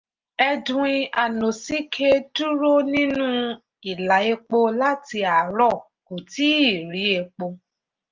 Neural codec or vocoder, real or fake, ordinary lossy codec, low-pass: none; real; Opus, 24 kbps; 7.2 kHz